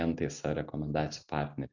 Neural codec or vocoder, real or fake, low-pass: none; real; 7.2 kHz